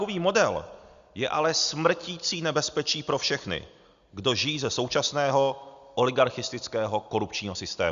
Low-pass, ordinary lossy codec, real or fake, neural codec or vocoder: 7.2 kHz; Opus, 64 kbps; real; none